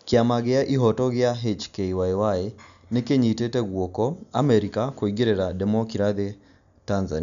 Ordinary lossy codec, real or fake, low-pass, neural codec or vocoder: none; real; 7.2 kHz; none